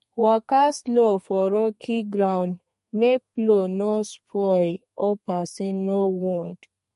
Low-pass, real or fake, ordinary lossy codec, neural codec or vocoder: 14.4 kHz; fake; MP3, 48 kbps; codec, 32 kHz, 1.9 kbps, SNAC